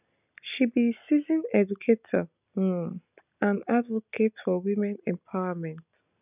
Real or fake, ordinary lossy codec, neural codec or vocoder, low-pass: real; none; none; 3.6 kHz